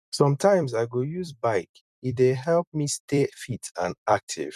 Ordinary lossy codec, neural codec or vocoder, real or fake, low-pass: none; vocoder, 44.1 kHz, 128 mel bands every 256 samples, BigVGAN v2; fake; 14.4 kHz